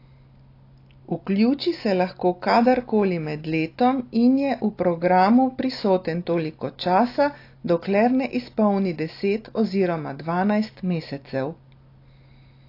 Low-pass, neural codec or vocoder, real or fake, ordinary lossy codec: 5.4 kHz; none; real; AAC, 32 kbps